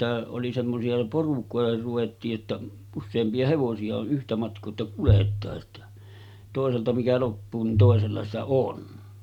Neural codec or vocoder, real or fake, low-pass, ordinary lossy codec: none; real; 19.8 kHz; none